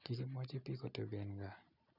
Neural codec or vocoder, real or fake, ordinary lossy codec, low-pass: none; real; none; 5.4 kHz